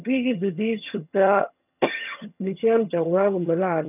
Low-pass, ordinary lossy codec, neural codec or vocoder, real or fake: 3.6 kHz; none; vocoder, 22.05 kHz, 80 mel bands, HiFi-GAN; fake